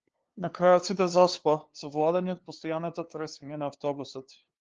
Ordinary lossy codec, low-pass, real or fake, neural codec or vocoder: Opus, 16 kbps; 7.2 kHz; fake; codec, 16 kHz, 2 kbps, FunCodec, trained on LibriTTS, 25 frames a second